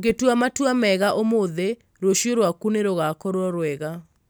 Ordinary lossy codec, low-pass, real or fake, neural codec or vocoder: none; none; real; none